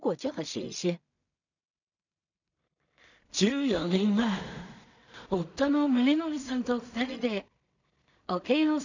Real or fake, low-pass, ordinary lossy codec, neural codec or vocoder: fake; 7.2 kHz; none; codec, 16 kHz in and 24 kHz out, 0.4 kbps, LongCat-Audio-Codec, two codebook decoder